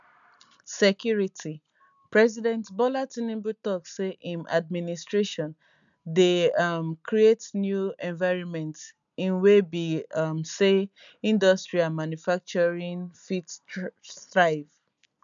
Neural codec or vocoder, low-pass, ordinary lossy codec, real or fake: none; 7.2 kHz; none; real